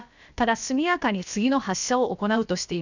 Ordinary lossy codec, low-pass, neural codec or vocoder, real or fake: none; 7.2 kHz; codec, 16 kHz, about 1 kbps, DyCAST, with the encoder's durations; fake